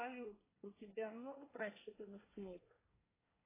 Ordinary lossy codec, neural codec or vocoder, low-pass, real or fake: AAC, 16 kbps; codec, 24 kHz, 1 kbps, SNAC; 3.6 kHz; fake